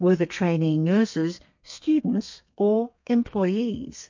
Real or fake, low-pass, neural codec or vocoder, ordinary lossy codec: fake; 7.2 kHz; codec, 32 kHz, 1.9 kbps, SNAC; MP3, 48 kbps